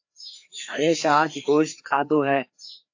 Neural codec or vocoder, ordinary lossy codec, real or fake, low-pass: codec, 16 kHz, 2 kbps, FreqCodec, larger model; AAC, 48 kbps; fake; 7.2 kHz